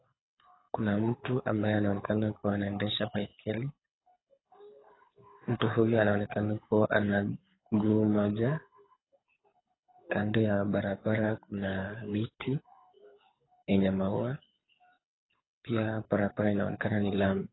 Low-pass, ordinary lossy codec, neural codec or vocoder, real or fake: 7.2 kHz; AAC, 16 kbps; codec, 24 kHz, 6 kbps, HILCodec; fake